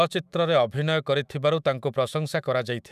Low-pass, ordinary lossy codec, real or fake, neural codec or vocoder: 14.4 kHz; none; fake; autoencoder, 48 kHz, 128 numbers a frame, DAC-VAE, trained on Japanese speech